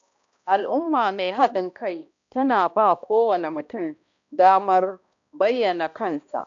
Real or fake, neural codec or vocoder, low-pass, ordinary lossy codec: fake; codec, 16 kHz, 1 kbps, X-Codec, HuBERT features, trained on balanced general audio; 7.2 kHz; AAC, 64 kbps